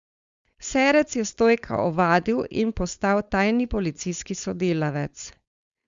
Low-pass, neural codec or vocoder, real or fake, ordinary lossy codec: 7.2 kHz; codec, 16 kHz, 4.8 kbps, FACodec; fake; Opus, 64 kbps